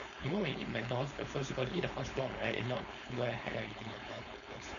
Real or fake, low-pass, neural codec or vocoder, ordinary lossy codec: fake; 7.2 kHz; codec, 16 kHz, 4.8 kbps, FACodec; none